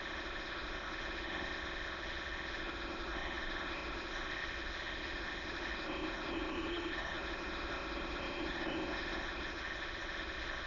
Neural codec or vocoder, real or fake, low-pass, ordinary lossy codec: autoencoder, 22.05 kHz, a latent of 192 numbers a frame, VITS, trained on many speakers; fake; 7.2 kHz; none